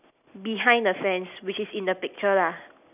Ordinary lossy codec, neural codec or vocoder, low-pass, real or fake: none; none; 3.6 kHz; real